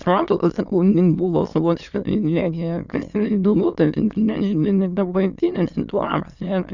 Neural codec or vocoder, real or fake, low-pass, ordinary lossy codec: autoencoder, 22.05 kHz, a latent of 192 numbers a frame, VITS, trained on many speakers; fake; 7.2 kHz; Opus, 64 kbps